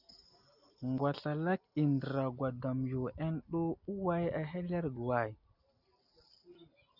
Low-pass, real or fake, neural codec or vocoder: 5.4 kHz; real; none